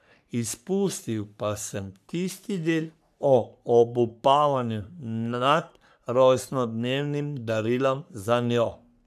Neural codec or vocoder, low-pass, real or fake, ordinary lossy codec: codec, 44.1 kHz, 3.4 kbps, Pupu-Codec; 14.4 kHz; fake; none